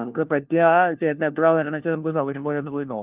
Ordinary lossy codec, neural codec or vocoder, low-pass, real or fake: Opus, 32 kbps; codec, 16 kHz, 1 kbps, FunCodec, trained on LibriTTS, 50 frames a second; 3.6 kHz; fake